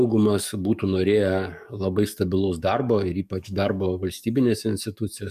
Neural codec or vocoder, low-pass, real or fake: codec, 44.1 kHz, 7.8 kbps, DAC; 14.4 kHz; fake